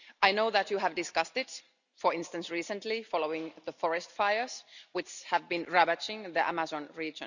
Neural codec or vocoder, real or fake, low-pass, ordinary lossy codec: none; real; 7.2 kHz; none